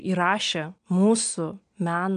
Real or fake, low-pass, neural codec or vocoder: real; 9.9 kHz; none